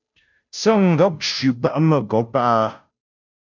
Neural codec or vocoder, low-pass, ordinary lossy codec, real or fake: codec, 16 kHz, 0.5 kbps, FunCodec, trained on Chinese and English, 25 frames a second; 7.2 kHz; MP3, 64 kbps; fake